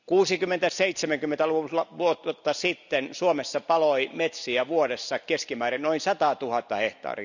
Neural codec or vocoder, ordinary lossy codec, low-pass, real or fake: none; none; 7.2 kHz; real